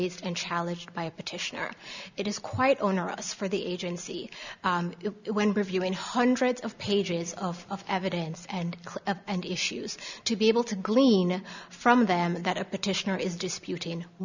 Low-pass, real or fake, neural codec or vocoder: 7.2 kHz; real; none